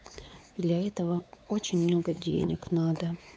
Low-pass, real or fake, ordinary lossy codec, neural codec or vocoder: none; fake; none; codec, 16 kHz, 4 kbps, X-Codec, WavLM features, trained on Multilingual LibriSpeech